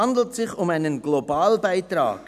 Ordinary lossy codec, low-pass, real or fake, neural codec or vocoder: none; 14.4 kHz; real; none